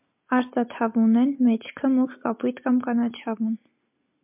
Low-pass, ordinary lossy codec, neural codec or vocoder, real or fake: 3.6 kHz; MP3, 32 kbps; none; real